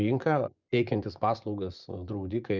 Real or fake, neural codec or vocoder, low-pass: fake; vocoder, 24 kHz, 100 mel bands, Vocos; 7.2 kHz